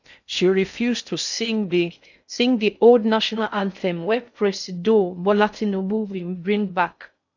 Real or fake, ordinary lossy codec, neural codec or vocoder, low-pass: fake; none; codec, 16 kHz in and 24 kHz out, 0.6 kbps, FocalCodec, streaming, 2048 codes; 7.2 kHz